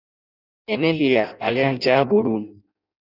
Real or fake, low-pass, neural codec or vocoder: fake; 5.4 kHz; codec, 16 kHz in and 24 kHz out, 0.6 kbps, FireRedTTS-2 codec